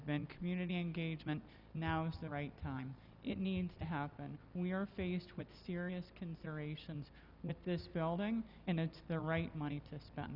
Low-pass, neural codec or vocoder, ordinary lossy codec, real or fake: 5.4 kHz; none; Opus, 64 kbps; real